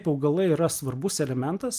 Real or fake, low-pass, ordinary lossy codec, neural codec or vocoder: real; 14.4 kHz; Opus, 32 kbps; none